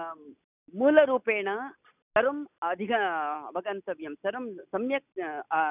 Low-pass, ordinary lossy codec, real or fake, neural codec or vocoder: 3.6 kHz; none; real; none